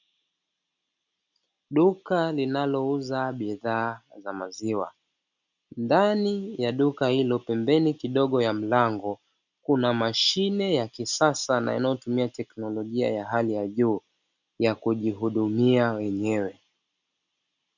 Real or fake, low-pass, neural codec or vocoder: real; 7.2 kHz; none